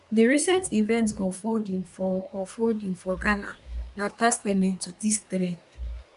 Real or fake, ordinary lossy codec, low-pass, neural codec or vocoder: fake; none; 10.8 kHz; codec, 24 kHz, 1 kbps, SNAC